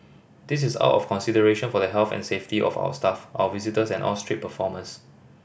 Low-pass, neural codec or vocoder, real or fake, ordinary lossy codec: none; none; real; none